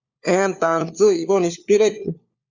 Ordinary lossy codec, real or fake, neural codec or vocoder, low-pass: Opus, 64 kbps; fake; codec, 16 kHz, 4 kbps, FunCodec, trained on LibriTTS, 50 frames a second; 7.2 kHz